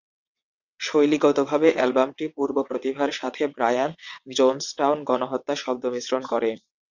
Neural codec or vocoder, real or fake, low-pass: vocoder, 22.05 kHz, 80 mel bands, WaveNeXt; fake; 7.2 kHz